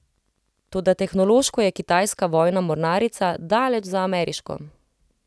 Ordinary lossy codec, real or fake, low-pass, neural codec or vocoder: none; real; none; none